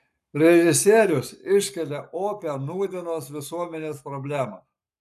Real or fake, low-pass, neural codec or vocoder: fake; 14.4 kHz; codec, 44.1 kHz, 7.8 kbps, Pupu-Codec